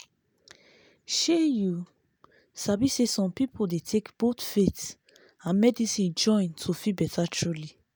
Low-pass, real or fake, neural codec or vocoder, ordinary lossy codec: none; real; none; none